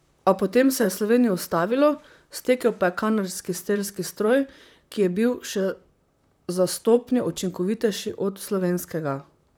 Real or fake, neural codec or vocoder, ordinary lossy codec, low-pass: fake; vocoder, 44.1 kHz, 128 mel bands, Pupu-Vocoder; none; none